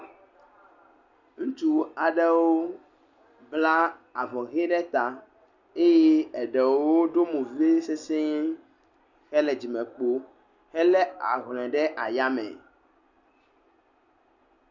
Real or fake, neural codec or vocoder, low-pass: real; none; 7.2 kHz